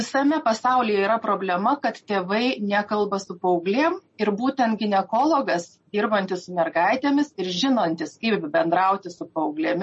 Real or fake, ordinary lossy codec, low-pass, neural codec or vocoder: real; MP3, 32 kbps; 10.8 kHz; none